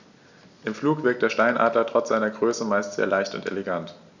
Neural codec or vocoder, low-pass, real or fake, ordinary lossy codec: none; 7.2 kHz; real; none